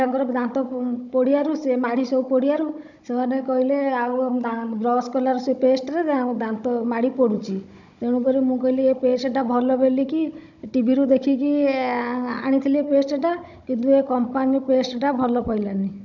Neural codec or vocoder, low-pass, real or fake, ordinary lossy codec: codec, 16 kHz, 16 kbps, FunCodec, trained on Chinese and English, 50 frames a second; 7.2 kHz; fake; none